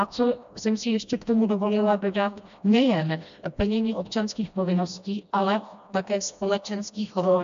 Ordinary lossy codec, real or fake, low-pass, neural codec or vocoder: AAC, 96 kbps; fake; 7.2 kHz; codec, 16 kHz, 1 kbps, FreqCodec, smaller model